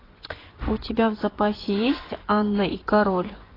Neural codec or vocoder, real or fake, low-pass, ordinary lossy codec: vocoder, 24 kHz, 100 mel bands, Vocos; fake; 5.4 kHz; AAC, 24 kbps